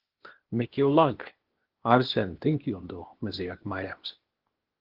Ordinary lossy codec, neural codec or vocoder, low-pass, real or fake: Opus, 16 kbps; codec, 16 kHz, 0.8 kbps, ZipCodec; 5.4 kHz; fake